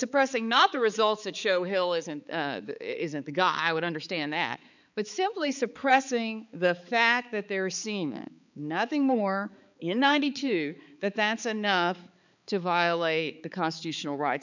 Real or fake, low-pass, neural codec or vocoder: fake; 7.2 kHz; codec, 16 kHz, 4 kbps, X-Codec, HuBERT features, trained on balanced general audio